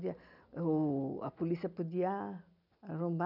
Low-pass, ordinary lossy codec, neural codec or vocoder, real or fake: 5.4 kHz; none; none; real